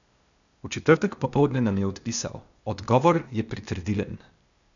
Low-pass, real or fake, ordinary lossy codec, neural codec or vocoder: 7.2 kHz; fake; none; codec, 16 kHz, 0.8 kbps, ZipCodec